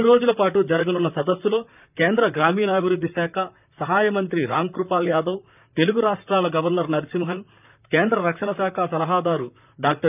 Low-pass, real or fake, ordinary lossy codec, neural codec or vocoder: 3.6 kHz; fake; none; vocoder, 44.1 kHz, 128 mel bands, Pupu-Vocoder